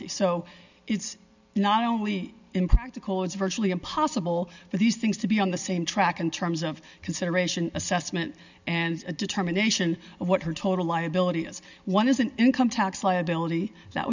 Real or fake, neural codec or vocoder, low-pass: real; none; 7.2 kHz